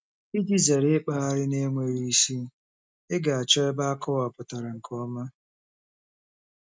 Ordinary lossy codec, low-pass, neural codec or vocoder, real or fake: none; none; none; real